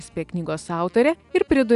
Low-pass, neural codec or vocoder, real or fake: 10.8 kHz; none; real